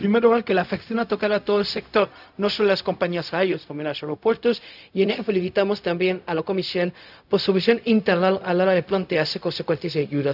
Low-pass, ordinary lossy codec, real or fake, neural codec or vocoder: 5.4 kHz; none; fake; codec, 16 kHz, 0.4 kbps, LongCat-Audio-Codec